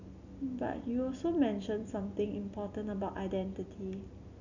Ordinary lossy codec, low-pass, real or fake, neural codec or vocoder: none; 7.2 kHz; real; none